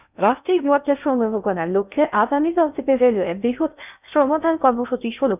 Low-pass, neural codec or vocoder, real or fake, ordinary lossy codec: 3.6 kHz; codec, 16 kHz in and 24 kHz out, 0.6 kbps, FocalCodec, streaming, 2048 codes; fake; none